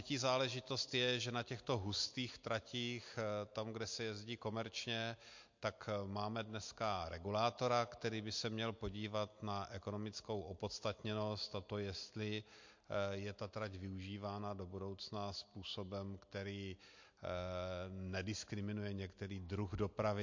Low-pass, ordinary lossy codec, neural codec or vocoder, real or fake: 7.2 kHz; MP3, 48 kbps; none; real